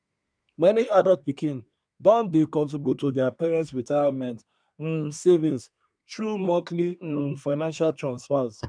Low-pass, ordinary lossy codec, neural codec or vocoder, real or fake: 9.9 kHz; none; codec, 24 kHz, 1 kbps, SNAC; fake